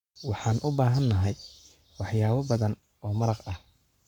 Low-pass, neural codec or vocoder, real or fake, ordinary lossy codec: 19.8 kHz; codec, 44.1 kHz, 7.8 kbps, Pupu-Codec; fake; none